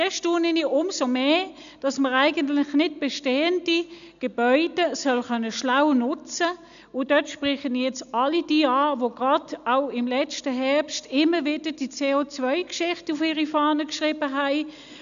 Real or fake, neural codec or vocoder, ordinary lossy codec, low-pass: real; none; none; 7.2 kHz